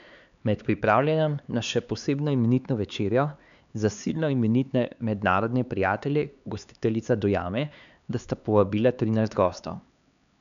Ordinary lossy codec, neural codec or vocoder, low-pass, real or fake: none; codec, 16 kHz, 4 kbps, X-Codec, HuBERT features, trained on LibriSpeech; 7.2 kHz; fake